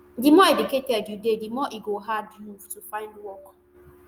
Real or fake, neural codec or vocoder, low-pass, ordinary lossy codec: real; none; 19.8 kHz; Opus, 32 kbps